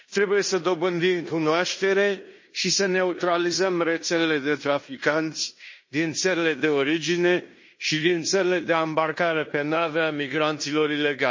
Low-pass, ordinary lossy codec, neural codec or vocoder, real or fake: 7.2 kHz; MP3, 32 kbps; codec, 16 kHz in and 24 kHz out, 0.9 kbps, LongCat-Audio-Codec, fine tuned four codebook decoder; fake